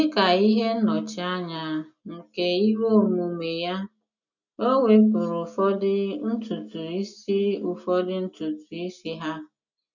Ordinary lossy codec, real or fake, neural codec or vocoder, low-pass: none; real; none; 7.2 kHz